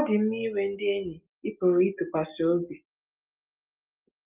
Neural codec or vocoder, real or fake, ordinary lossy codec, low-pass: none; real; Opus, 32 kbps; 3.6 kHz